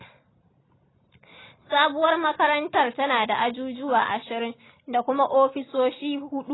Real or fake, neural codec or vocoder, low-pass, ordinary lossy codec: real; none; 7.2 kHz; AAC, 16 kbps